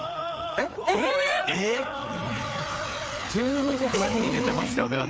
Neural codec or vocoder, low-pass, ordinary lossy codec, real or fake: codec, 16 kHz, 4 kbps, FreqCodec, larger model; none; none; fake